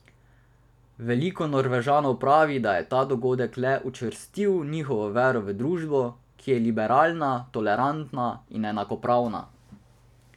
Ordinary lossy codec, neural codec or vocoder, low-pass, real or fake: none; none; 19.8 kHz; real